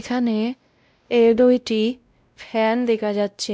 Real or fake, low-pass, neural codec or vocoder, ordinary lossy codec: fake; none; codec, 16 kHz, 0.5 kbps, X-Codec, WavLM features, trained on Multilingual LibriSpeech; none